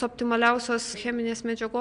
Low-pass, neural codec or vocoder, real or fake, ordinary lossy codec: 9.9 kHz; none; real; MP3, 64 kbps